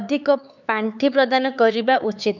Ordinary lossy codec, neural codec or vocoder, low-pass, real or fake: none; codec, 16 kHz, 4 kbps, X-Codec, HuBERT features, trained on LibriSpeech; 7.2 kHz; fake